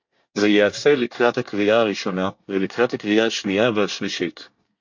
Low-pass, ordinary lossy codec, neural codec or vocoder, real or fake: 7.2 kHz; MP3, 48 kbps; codec, 24 kHz, 1 kbps, SNAC; fake